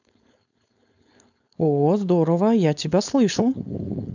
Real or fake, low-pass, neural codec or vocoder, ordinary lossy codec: fake; 7.2 kHz; codec, 16 kHz, 4.8 kbps, FACodec; none